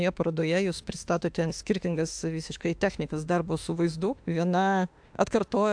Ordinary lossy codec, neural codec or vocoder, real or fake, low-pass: AAC, 64 kbps; autoencoder, 48 kHz, 32 numbers a frame, DAC-VAE, trained on Japanese speech; fake; 9.9 kHz